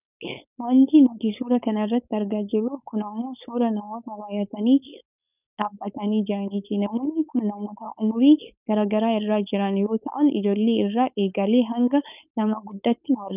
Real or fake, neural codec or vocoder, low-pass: fake; codec, 16 kHz, 4.8 kbps, FACodec; 3.6 kHz